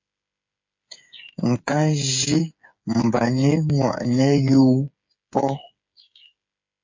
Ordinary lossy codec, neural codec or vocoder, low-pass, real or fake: MP3, 48 kbps; codec, 16 kHz, 8 kbps, FreqCodec, smaller model; 7.2 kHz; fake